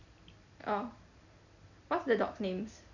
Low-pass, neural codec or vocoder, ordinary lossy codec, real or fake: 7.2 kHz; none; none; real